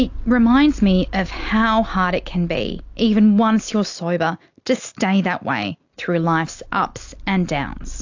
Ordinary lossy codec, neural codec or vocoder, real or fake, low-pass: AAC, 48 kbps; none; real; 7.2 kHz